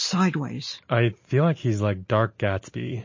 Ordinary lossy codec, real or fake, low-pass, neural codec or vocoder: MP3, 32 kbps; real; 7.2 kHz; none